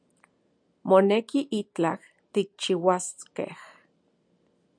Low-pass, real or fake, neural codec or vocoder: 9.9 kHz; real; none